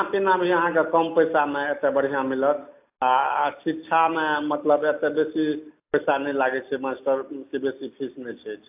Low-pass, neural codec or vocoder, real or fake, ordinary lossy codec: 3.6 kHz; none; real; AAC, 32 kbps